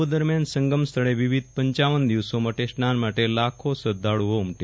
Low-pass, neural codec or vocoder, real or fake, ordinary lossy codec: none; none; real; none